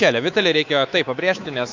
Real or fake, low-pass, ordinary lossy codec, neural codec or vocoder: fake; 7.2 kHz; AAC, 48 kbps; codec, 24 kHz, 3.1 kbps, DualCodec